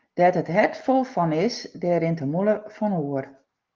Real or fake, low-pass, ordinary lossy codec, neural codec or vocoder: real; 7.2 kHz; Opus, 32 kbps; none